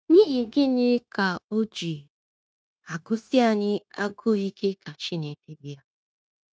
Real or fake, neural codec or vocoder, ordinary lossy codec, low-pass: fake; codec, 16 kHz, 0.9 kbps, LongCat-Audio-Codec; none; none